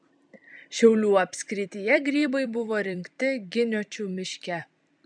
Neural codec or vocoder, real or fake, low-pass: vocoder, 44.1 kHz, 128 mel bands every 256 samples, BigVGAN v2; fake; 9.9 kHz